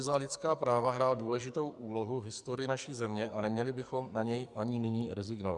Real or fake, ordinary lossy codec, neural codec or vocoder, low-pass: fake; Opus, 64 kbps; codec, 44.1 kHz, 2.6 kbps, SNAC; 10.8 kHz